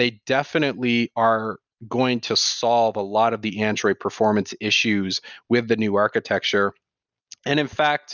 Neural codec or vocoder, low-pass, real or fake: none; 7.2 kHz; real